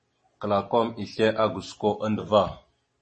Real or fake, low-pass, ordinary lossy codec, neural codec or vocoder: real; 10.8 kHz; MP3, 32 kbps; none